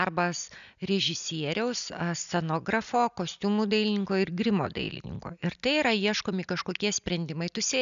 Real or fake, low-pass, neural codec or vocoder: real; 7.2 kHz; none